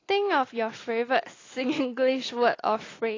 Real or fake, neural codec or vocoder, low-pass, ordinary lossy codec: real; none; 7.2 kHz; AAC, 32 kbps